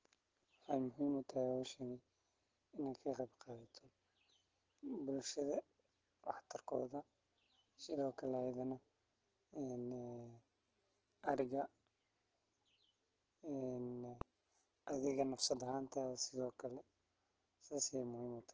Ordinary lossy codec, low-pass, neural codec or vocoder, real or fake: Opus, 16 kbps; 7.2 kHz; none; real